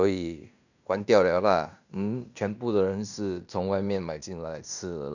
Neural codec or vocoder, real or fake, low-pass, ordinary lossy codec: codec, 16 kHz in and 24 kHz out, 0.9 kbps, LongCat-Audio-Codec, fine tuned four codebook decoder; fake; 7.2 kHz; none